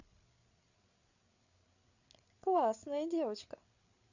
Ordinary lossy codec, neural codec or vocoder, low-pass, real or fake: none; codec, 16 kHz, 8 kbps, FreqCodec, larger model; 7.2 kHz; fake